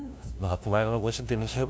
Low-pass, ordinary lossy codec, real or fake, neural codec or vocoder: none; none; fake; codec, 16 kHz, 0.5 kbps, FunCodec, trained on LibriTTS, 25 frames a second